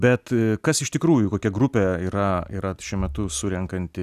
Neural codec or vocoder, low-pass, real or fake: none; 14.4 kHz; real